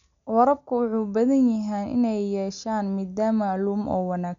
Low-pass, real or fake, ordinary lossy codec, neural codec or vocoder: 7.2 kHz; real; none; none